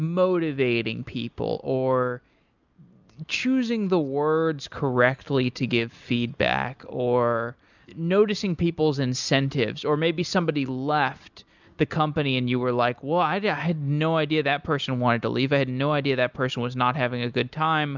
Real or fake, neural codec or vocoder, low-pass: real; none; 7.2 kHz